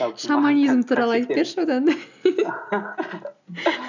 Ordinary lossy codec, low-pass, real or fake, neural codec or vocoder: none; 7.2 kHz; real; none